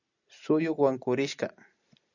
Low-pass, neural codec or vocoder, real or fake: 7.2 kHz; none; real